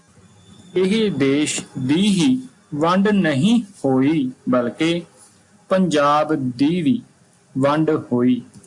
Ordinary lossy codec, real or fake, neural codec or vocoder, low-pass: AAC, 64 kbps; real; none; 10.8 kHz